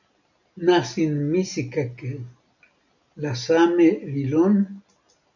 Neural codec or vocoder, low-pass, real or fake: none; 7.2 kHz; real